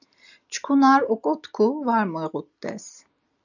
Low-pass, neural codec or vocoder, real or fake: 7.2 kHz; none; real